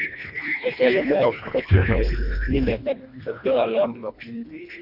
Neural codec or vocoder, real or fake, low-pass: codec, 24 kHz, 1.5 kbps, HILCodec; fake; 5.4 kHz